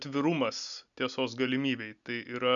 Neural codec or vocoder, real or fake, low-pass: none; real; 7.2 kHz